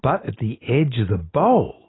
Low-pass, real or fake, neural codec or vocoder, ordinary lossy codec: 7.2 kHz; real; none; AAC, 16 kbps